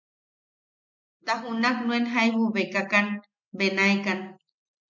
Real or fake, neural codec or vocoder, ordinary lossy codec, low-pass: real; none; MP3, 64 kbps; 7.2 kHz